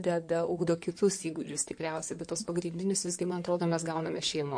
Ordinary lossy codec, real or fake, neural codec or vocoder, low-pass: MP3, 48 kbps; fake; codec, 16 kHz in and 24 kHz out, 2.2 kbps, FireRedTTS-2 codec; 9.9 kHz